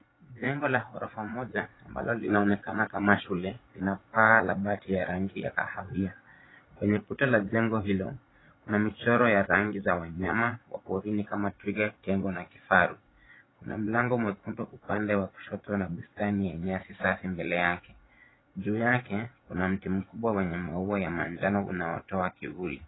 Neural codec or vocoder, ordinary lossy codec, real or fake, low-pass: vocoder, 44.1 kHz, 80 mel bands, Vocos; AAC, 16 kbps; fake; 7.2 kHz